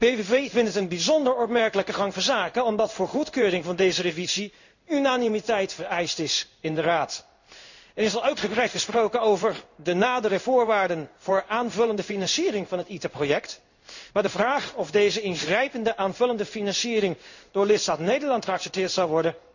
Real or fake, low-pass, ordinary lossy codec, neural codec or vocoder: fake; 7.2 kHz; none; codec, 16 kHz in and 24 kHz out, 1 kbps, XY-Tokenizer